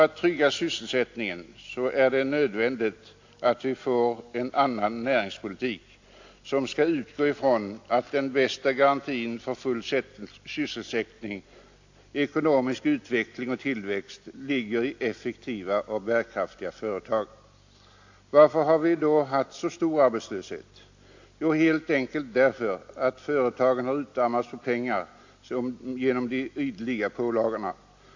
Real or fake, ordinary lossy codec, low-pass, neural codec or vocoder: real; AAC, 48 kbps; 7.2 kHz; none